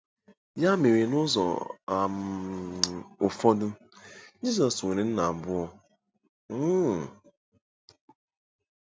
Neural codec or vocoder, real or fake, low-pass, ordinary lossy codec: none; real; none; none